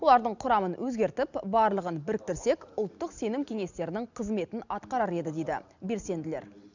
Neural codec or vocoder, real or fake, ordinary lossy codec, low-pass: none; real; none; 7.2 kHz